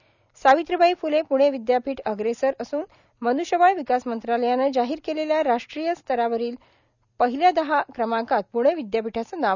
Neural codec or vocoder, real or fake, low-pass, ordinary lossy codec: none; real; 7.2 kHz; none